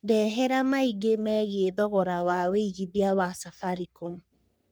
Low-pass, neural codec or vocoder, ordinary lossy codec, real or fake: none; codec, 44.1 kHz, 3.4 kbps, Pupu-Codec; none; fake